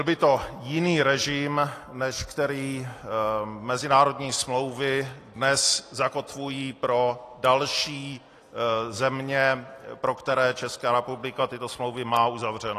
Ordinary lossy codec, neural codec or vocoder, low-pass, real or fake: AAC, 48 kbps; none; 14.4 kHz; real